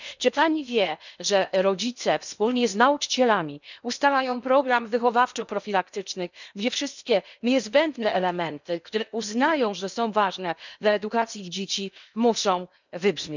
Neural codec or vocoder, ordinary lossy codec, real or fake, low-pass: codec, 16 kHz in and 24 kHz out, 0.8 kbps, FocalCodec, streaming, 65536 codes; none; fake; 7.2 kHz